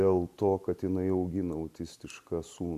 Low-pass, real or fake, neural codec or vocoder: 14.4 kHz; real; none